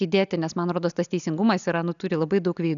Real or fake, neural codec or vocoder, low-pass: real; none; 7.2 kHz